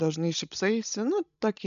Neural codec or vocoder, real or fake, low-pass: codec, 16 kHz, 16 kbps, FreqCodec, larger model; fake; 7.2 kHz